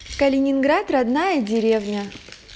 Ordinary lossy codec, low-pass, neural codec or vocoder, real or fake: none; none; none; real